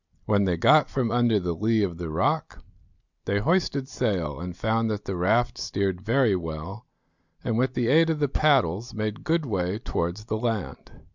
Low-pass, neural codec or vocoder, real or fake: 7.2 kHz; none; real